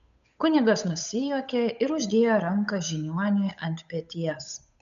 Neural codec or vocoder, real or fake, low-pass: codec, 16 kHz, 8 kbps, FunCodec, trained on Chinese and English, 25 frames a second; fake; 7.2 kHz